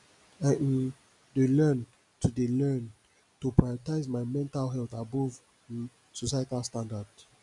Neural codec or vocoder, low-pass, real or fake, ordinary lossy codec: none; 10.8 kHz; real; none